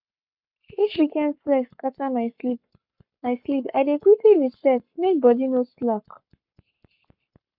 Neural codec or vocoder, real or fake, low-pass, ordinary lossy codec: codec, 44.1 kHz, 7.8 kbps, Pupu-Codec; fake; 5.4 kHz; none